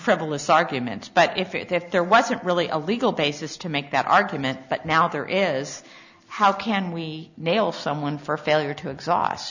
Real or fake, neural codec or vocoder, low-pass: real; none; 7.2 kHz